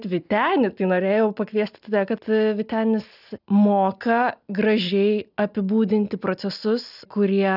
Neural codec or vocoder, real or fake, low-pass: none; real; 5.4 kHz